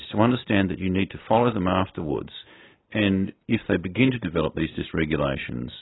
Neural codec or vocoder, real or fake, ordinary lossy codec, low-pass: none; real; AAC, 16 kbps; 7.2 kHz